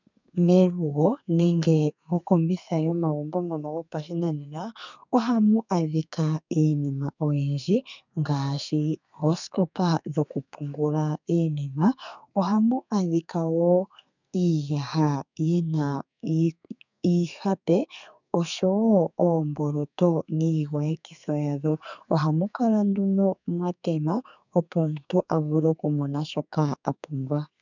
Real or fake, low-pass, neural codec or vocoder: fake; 7.2 kHz; codec, 32 kHz, 1.9 kbps, SNAC